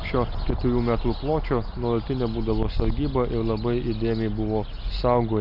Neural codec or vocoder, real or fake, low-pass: none; real; 5.4 kHz